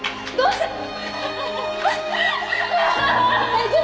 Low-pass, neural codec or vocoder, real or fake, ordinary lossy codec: none; none; real; none